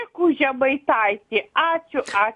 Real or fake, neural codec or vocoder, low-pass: real; none; 10.8 kHz